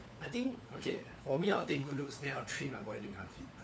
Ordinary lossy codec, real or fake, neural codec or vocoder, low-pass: none; fake; codec, 16 kHz, 4 kbps, FunCodec, trained on LibriTTS, 50 frames a second; none